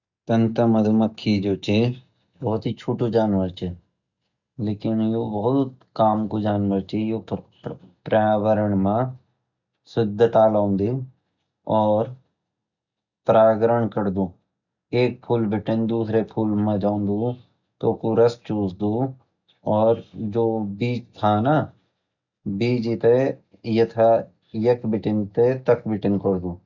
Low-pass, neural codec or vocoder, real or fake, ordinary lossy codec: 7.2 kHz; none; real; none